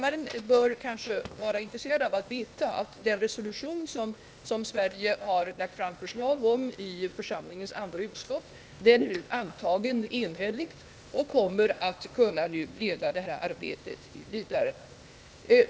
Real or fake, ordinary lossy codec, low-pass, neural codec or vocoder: fake; none; none; codec, 16 kHz, 0.8 kbps, ZipCodec